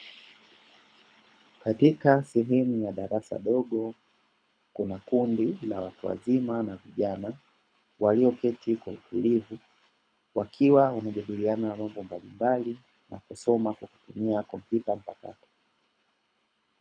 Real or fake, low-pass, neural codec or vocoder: fake; 9.9 kHz; codec, 24 kHz, 6 kbps, HILCodec